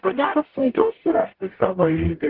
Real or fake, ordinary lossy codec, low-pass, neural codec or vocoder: fake; Opus, 16 kbps; 5.4 kHz; codec, 44.1 kHz, 0.9 kbps, DAC